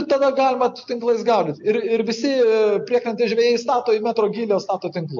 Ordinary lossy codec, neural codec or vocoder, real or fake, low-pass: MP3, 48 kbps; none; real; 7.2 kHz